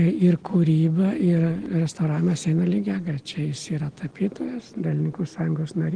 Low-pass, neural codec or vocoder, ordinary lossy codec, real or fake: 9.9 kHz; none; Opus, 16 kbps; real